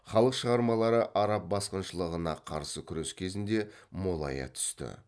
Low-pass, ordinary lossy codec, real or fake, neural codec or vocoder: none; none; real; none